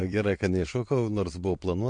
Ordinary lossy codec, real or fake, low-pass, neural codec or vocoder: MP3, 48 kbps; fake; 9.9 kHz; vocoder, 22.05 kHz, 80 mel bands, WaveNeXt